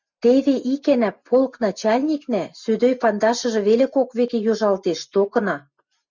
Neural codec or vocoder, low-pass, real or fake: none; 7.2 kHz; real